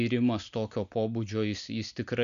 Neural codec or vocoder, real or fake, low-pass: none; real; 7.2 kHz